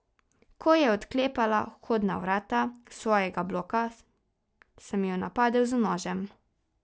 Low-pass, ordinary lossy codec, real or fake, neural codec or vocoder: none; none; real; none